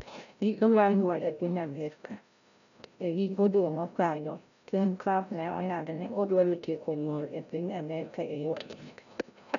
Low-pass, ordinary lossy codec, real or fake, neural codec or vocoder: 7.2 kHz; none; fake; codec, 16 kHz, 0.5 kbps, FreqCodec, larger model